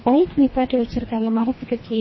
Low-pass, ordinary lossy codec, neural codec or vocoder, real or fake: 7.2 kHz; MP3, 24 kbps; codec, 24 kHz, 1.5 kbps, HILCodec; fake